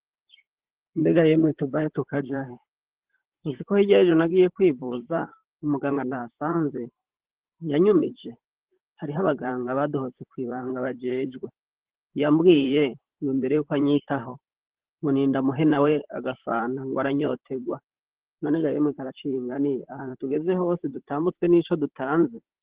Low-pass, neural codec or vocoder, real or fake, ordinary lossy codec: 3.6 kHz; vocoder, 44.1 kHz, 128 mel bands, Pupu-Vocoder; fake; Opus, 16 kbps